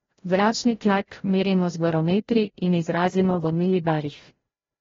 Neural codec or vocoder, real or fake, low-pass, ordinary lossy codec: codec, 16 kHz, 0.5 kbps, FreqCodec, larger model; fake; 7.2 kHz; AAC, 24 kbps